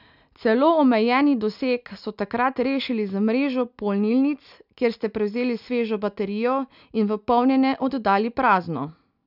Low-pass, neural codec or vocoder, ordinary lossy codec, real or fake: 5.4 kHz; none; none; real